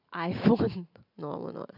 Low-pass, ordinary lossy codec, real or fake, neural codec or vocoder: 5.4 kHz; none; real; none